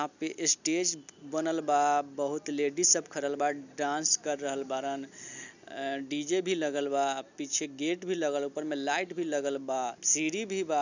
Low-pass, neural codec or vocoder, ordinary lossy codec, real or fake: 7.2 kHz; none; none; real